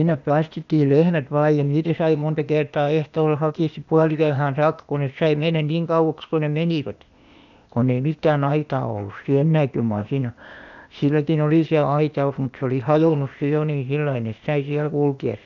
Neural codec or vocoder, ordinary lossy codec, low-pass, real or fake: codec, 16 kHz, 0.8 kbps, ZipCodec; none; 7.2 kHz; fake